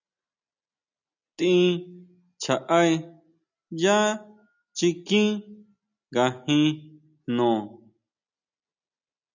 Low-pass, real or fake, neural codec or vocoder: 7.2 kHz; real; none